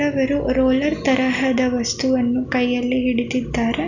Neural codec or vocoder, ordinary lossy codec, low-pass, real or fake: none; none; 7.2 kHz; real